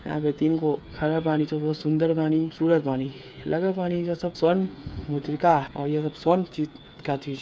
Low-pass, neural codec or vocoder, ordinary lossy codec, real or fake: none; codec, 16 kHz, 8 kbps, FreqCodec, smaller model; none; fake